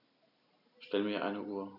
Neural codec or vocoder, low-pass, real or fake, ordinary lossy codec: none; 5.4 kHz; real; none